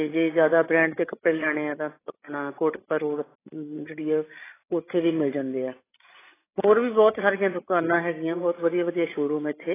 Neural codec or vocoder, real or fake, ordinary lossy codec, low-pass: codec, 16 kHz, 16 kbps, FreqCodec, larger model; fake; AAC, 16 kbps; 3.6 kHz